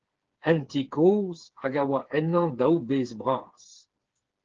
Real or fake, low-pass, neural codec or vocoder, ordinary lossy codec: fake; 7.2 kHz; codec, 16 kHz, 4 kbps, FreqCodec, smaller model; Opus, 16 kbps